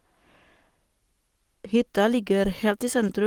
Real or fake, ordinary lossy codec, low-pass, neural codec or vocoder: fake; Opus, 24 kbps; 19.8 kHz; codec, 44.1 kHz, 7.8 kbps, Pupu-Codec